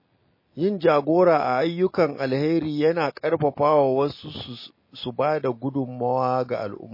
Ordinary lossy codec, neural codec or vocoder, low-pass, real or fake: MP3, 24 kbps; none; 5.4 kHz; real